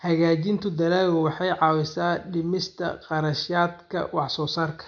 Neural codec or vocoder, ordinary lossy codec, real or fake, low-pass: none; none; real; 7.2 kHz